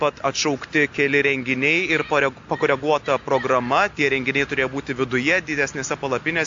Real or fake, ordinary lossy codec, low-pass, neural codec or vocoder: real; AAC, 64 kbps; 7.2 kHz; none